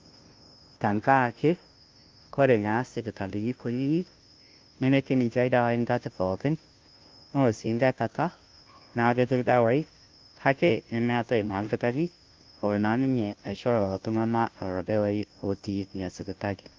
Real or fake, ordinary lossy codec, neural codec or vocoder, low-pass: fake; Opus, 24 kbps; codec, 16 kHz, 0.5 kbps, FunCodec, trained on Chinese and English, 25 frames a second; 7.2 kHz